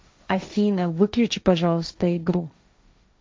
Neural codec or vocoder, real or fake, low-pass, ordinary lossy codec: codec, 16 kHz, 1.1 kbps, Voila-Tokenizer; fake; none; none